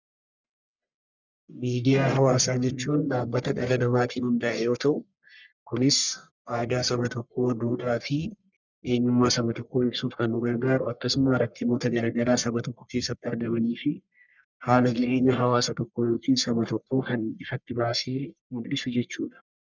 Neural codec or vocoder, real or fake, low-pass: codec, 44.1 kHz, 1.7 kbps, Pupu-Codec; fake; 7.2 kHz